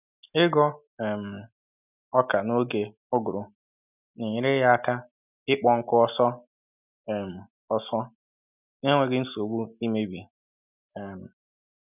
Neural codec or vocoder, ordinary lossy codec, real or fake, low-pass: none; none; real; 3.6 kHz